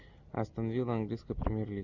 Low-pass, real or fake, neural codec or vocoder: 7.2 kHz; real; none